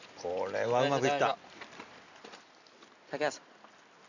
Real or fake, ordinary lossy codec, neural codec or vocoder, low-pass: real; none; none; 7.2 kHz